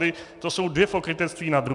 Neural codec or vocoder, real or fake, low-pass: none; real; 10.8 kHz